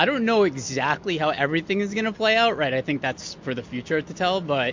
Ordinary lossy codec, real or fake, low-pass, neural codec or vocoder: MP3, 48 kbps; real; 7.2 kHz; none